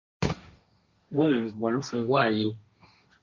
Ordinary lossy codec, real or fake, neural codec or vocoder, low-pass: Opus, 64 kbps; fake; codec, 16 kHz, 1.1 kbps, Voila-Tokenizer; 7.2 kHz